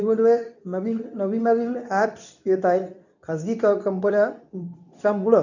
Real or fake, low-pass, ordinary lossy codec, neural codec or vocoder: fake; 7.2 kHz; none; codec, 24 kHz, 0.9 kbps, WavTokenizer, medium speech release version 2